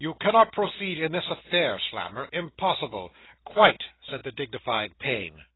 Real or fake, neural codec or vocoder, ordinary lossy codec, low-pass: real; none; AAC, 16 kbps; 7.2 kHz